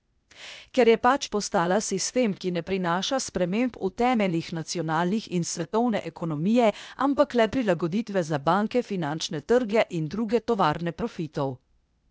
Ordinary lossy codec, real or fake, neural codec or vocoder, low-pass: none; fake; codec, 16 kHz, 0.8 kbps, ZipCodec; none